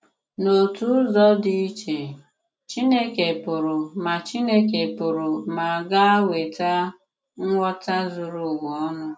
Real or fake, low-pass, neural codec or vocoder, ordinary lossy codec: real; none; none; none